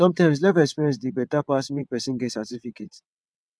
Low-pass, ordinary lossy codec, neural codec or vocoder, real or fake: none; none; vocoder, 22.05 kHz, 80 mel bands, WaveNeXt; fake